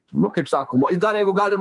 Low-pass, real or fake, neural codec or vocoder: 10.8 kHz; fake; autoencoder, 48 kHz, 32 numbers a frame, DAC-VAE, trained on Japanese speech